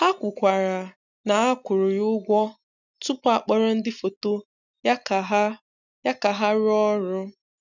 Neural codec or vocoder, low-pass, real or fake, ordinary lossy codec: none; 7.2 kHz; real; none